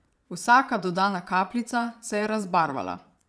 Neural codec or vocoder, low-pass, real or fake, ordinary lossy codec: vocoder, 22.05 kHz, 80 mel bands, Vocos; none; fake; none